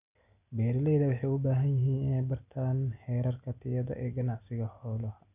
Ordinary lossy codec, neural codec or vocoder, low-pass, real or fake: none; none; 3.6 kHz; real